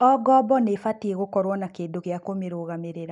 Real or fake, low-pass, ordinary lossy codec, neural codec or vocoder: real; 10.8 kHz; none; none